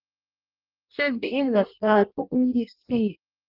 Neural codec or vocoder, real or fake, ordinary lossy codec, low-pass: codec, 16 kHz in and 24 kHz out, 0.6 kbps, FireRedTTS-2 codec; fake; Opus, 24 kbps; 5.4 kHz